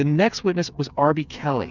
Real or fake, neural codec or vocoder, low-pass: fake; codec, 16 kHz, 4 kbps, FreqCodec, smaller model; 7.2 kHz